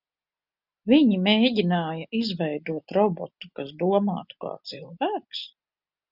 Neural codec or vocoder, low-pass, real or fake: none; 5.4 kHz; real